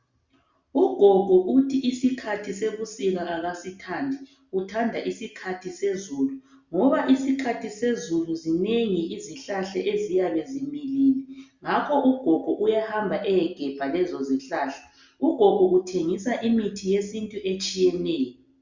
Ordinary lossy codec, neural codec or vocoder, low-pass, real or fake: Opus, 64 kbps; none; 7.2 kHz; real